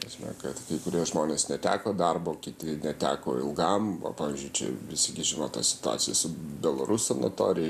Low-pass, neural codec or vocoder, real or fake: 14.4 kHz; none; real